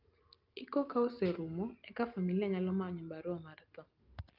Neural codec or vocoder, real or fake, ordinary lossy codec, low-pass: none; real; Opus, 24 kbps; 5.4 kHz